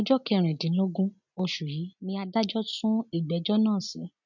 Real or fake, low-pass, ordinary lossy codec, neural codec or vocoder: real; 7.2 kHz; none; none